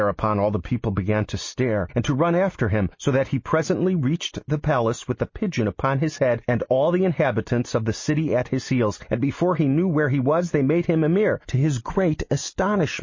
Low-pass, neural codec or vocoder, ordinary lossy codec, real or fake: 7.2 kHz; none; MP3, 32 kbps; real